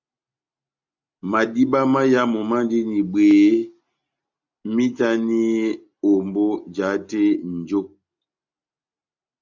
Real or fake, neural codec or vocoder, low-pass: real; none; 7.2 kHz